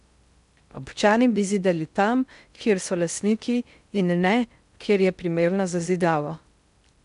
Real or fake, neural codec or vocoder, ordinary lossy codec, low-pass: fake; codec, 16 kHz in and 24 kHz out, 0.6 kbps, FocalCodec, streaming, 4096 codes; none; 10.8 kHz